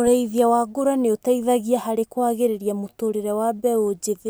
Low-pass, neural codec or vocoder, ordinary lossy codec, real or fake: none; none; none; real